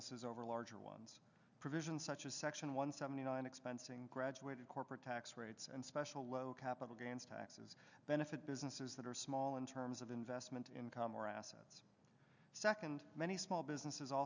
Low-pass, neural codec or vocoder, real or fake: 7.2 kHz; none; real